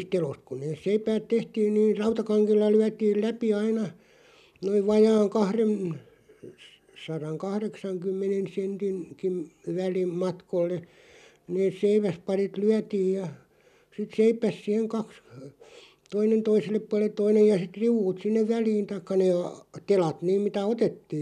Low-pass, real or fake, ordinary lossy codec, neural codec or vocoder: 14.4 kHz; real; none; none